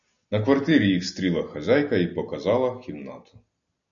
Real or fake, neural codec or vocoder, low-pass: real; none; 7.2 kHz